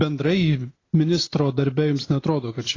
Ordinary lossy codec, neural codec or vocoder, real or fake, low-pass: AAC, 32 kbps; none; real; 7.2 kHz